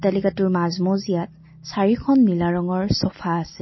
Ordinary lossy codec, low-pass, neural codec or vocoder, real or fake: MP3, 24 kbps; 7.2 kHz; none; real